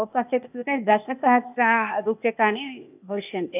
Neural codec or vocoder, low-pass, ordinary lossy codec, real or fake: codec, 16 kHz, 0.8 kbps, ZipCodec; 3.6 kHz; none; fake